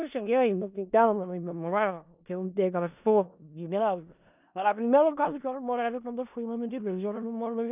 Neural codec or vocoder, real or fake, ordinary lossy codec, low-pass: codec, 16 kHz in and 24 kHz out, 0.4 kbps, LongCat-Audio-Codec, four codebook decoder; fake; none; 3.6 kHz